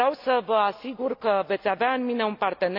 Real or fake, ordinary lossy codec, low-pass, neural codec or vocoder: real; none; 5.4 kHz; none